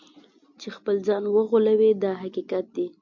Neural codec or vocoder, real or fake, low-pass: none; real; 7.2 kHz